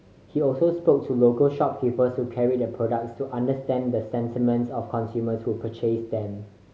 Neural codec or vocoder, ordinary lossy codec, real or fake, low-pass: none; none; real; none